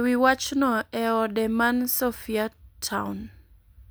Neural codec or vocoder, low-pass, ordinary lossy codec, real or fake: none; none; none; real